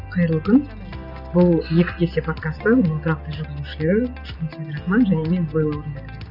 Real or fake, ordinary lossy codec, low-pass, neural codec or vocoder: real; none; 5.4 kHz; none